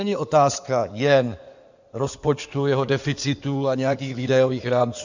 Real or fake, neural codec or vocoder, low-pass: fake; codec, 16 kHz in and 24 kHz out, 2.2 kbps, FireRedTTS-2 codec; 7.2 kHz